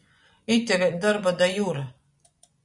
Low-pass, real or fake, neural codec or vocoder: 10.8 kHz; fake; vocoder, 24 kHz, 100 mel bands, Vocos